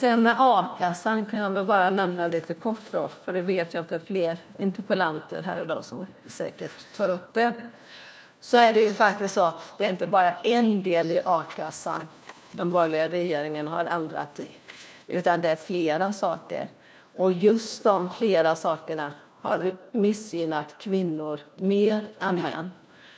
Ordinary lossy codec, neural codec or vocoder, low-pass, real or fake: none; codec, 16 kHz, 1 kbps, FunCodec, trained on LibriTTS, 50 frames a second; none; fake